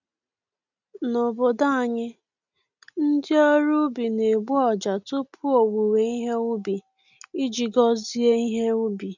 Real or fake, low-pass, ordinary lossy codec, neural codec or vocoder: real; 7.2 kHz; none; none